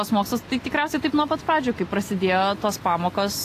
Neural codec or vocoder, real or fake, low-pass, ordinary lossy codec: none; real; 14.4 kHz; AAC, 48 kbps